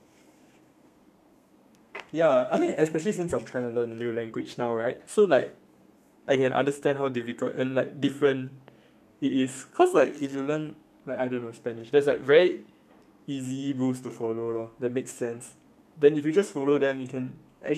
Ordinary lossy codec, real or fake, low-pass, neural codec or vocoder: none; fake; 14.4 kHz; codec, 32 kHz, 1.9 kbps, SNAC